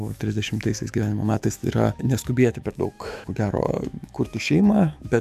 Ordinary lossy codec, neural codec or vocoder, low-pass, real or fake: AAC, 96 kbps; autoencoder, 48 kHz, 128 numbers a frame, DAC-VAE, trained on Japanese speech; 14.4 kHz; fake